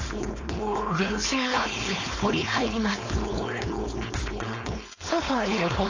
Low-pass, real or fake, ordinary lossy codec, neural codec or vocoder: 7.2 kHz; fake; AAC, 48 kbps; codec, 16 kHz, 4.8 kbps, FACodec